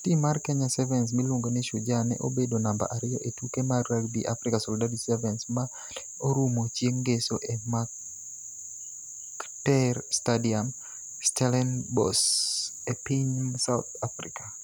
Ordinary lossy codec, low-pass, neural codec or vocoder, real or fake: none; none; none; real